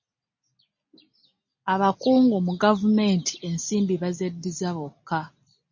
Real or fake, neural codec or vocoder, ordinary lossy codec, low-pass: real; none; MP3, 32 kbps; 7.2 kHz